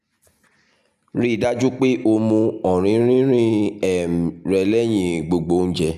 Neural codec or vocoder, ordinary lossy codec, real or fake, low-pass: none; none; real; 14.4 kHz